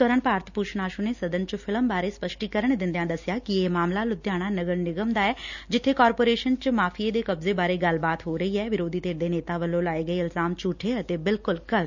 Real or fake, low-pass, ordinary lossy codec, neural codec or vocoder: real; 7.2 kHz; none; none